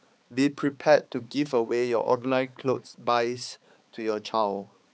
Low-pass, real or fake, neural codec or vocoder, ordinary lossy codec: none; fake; codec, 16 kHz, 4 kbps, X-Codec, HuBERT features, trained on balanced general audio; none